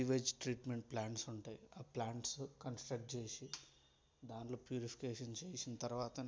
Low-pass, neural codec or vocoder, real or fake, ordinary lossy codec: none; none; real; none